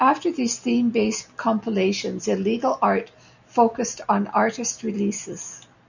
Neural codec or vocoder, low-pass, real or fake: none; 7.2 kHz; real